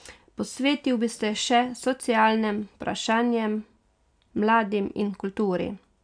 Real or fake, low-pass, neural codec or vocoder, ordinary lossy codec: real; 9.9 kHz; none; none